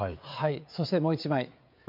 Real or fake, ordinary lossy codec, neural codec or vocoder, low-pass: fake; MP3, 48 kbps; codec, 16 kHz, 16 kbps, FreqCodec, smaller model; 5.4 kHz